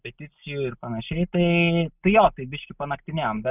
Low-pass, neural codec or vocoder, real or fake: 3.6 kHz; none; real